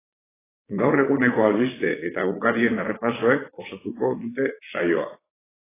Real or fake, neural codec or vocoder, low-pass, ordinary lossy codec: fake; vocoder, 22.05 kHz, 80 mel bands, Vocos; 3.6 kHz; AAC, 16 kbps